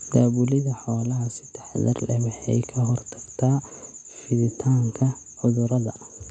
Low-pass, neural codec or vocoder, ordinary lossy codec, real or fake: 9.9 kHz; none; none; real